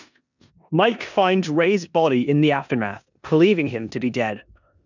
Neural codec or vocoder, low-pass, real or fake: codec, 16 kHz in and 24 kHz out, 0.9 kbps, LongCat-Audio-Codec, four codebook decoder; 7.2 kHz; fake